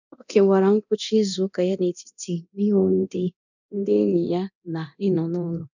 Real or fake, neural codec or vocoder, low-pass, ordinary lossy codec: fake; codec, 24 kHz, 0.9 kbps, DualCodec; 7.2 kHz; none